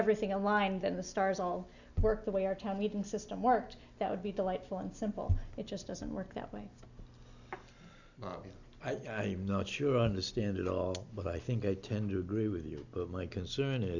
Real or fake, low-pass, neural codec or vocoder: real; 7.2 kHz; none